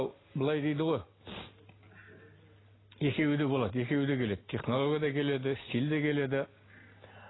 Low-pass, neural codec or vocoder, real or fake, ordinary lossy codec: 7.2 kHz; none; real; AAC, 16 kbps